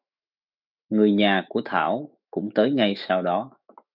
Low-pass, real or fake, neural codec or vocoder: 5.4 kHz; fake; autoencoder, 48 kHz, 128 numbers a frame, DAC-VAE, trained on Japanese speech